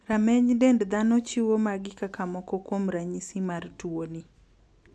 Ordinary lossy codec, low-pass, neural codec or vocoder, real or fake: none; none; none; real